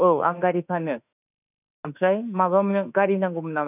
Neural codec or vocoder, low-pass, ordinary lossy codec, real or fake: autoencoder, 48 kHz, 32 numbers a frame, DAC-VAE, trained on Japanese speech; 3.6 kHz; none; fake